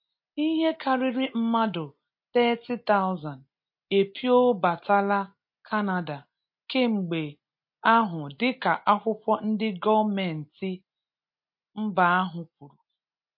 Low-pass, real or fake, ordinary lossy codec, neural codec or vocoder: 5.4 kHz; real; MP3, 32 kbps; none